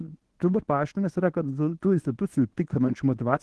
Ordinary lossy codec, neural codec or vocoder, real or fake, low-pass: Opus, 16 kbps; codec, 24 kHz, 0.9 kbps, WavTokenizer, medium speech release version 1; fake; 10.8 kHz